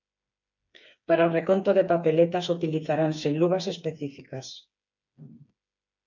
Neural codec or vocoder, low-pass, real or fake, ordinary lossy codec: codec, 16 kHz, 4 kbps, FreqCodec, smaller model; 7.2 kHz; fake; MP3, 64 kbps